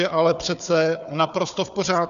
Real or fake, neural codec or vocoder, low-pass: fake; codec, 16 kHz, 4 kbps, FunCodec, trained on Chinese and English, 50 frames a second; 7.2 kHz